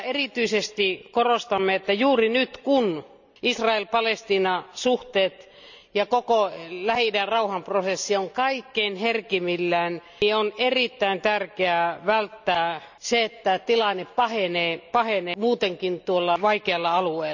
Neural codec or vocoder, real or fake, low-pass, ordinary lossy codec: none; real; 7.2 kHz; none